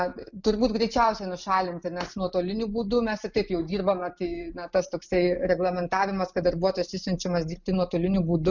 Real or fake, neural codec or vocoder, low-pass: real; none; 7.2 kHz